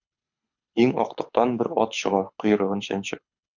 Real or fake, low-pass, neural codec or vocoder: fake; 7.2 kHz; codec, 24 kHz, 6 kbps, HILCodec